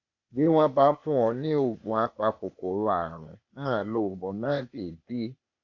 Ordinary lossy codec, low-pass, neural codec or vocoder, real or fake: none; 7.2 kHz; codec, 16 kHz, 0.8 kbps, ZipCodec; fake